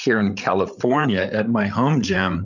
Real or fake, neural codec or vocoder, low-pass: fake; codec, 16 kHz, 8 kbps, FreqCodec, larger model; 7.2 kHz